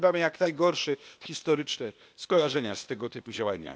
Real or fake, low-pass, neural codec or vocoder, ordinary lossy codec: fake; none; codec, 16 kHz, 0.8 kbps, ZipCodec; none